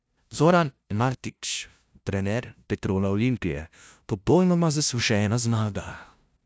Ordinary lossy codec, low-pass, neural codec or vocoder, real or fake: none; none; codec, 16 kHz, 0.5 kbps, FunCodec, trained on LibriTTS, 25 frames a second; fake